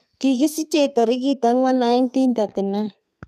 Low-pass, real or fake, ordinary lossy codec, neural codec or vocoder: 14.4 kHz; fake; none; codec, 32 kHz, 1.9 kbps, SNAC